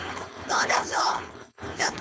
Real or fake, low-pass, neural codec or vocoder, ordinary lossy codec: fake; none; codec, 16 kHz, 4.8 kbps, FACodec; none